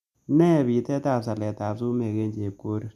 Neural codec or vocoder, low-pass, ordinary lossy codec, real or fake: none; 14.4 kHz; none; real